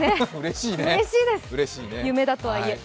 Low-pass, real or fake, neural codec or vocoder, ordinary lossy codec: none; real; none; none